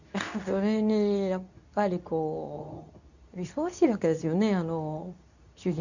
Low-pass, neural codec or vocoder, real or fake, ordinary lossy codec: 7.2 kHz; codec, 24 kHz, 0.9 kbps, WavTokenizer, medium speech release version 2; fake; none